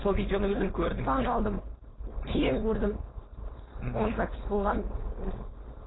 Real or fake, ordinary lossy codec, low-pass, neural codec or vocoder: fake; AAC, 16 kbps; 7.2 kHz; codec, 16 kHz, 4.8 kbps, FACodec